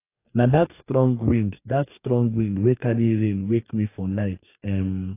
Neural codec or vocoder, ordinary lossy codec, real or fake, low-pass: codec, 44.1 kHz, 2.6 kbps, DAC; AAC, 24 kbps; fake; 3.6 kHz